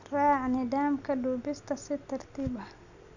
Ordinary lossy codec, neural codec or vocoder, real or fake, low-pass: none; none; real; 7.2 kHz